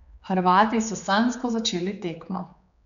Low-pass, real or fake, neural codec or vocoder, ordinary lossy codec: 7.2 kHz; fake; codec, 16 kHz, 4 kbps, X-Codec, HuBERT features, trained on general audio; none